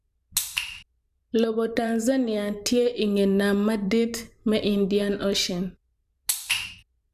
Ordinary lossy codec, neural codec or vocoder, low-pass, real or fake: none; vocoder, 44.1 kHz, 128 mel bands every 512 samples, BigVGAN v2; 14.4 kHz; fake